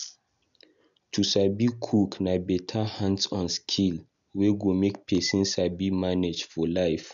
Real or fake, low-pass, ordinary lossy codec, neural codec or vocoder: real; 7.2 kHz; none; none